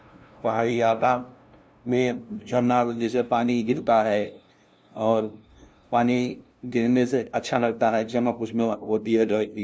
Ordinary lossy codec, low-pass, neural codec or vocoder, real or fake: none; none; codec, 16 kHz, 0.5 kbps, FunCodec, trained on LibriTTS, 25 frames a second; fake